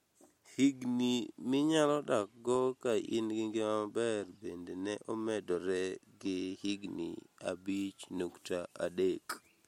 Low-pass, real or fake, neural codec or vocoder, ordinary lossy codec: 19.8 kHz; real; none; MP3, 64 kbps